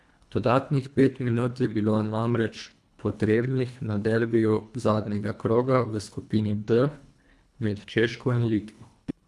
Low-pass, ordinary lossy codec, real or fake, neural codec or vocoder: none; none; fake; codec, 24 kHz, 1.5 kbps, HILCodec